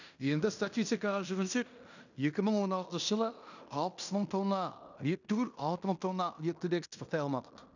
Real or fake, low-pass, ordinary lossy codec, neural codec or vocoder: fake; 7.2 kHz; none; codec, 16 kHz in and 24 kHz out, 0.9 kbps, LongCat-Audio-Codec, fine tuned four codebook decoder